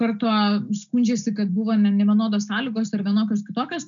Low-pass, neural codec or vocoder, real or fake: 7.2 kHz; none; real